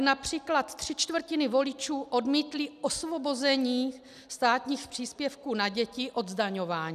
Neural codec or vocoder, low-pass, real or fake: none; 14.4 kHz; real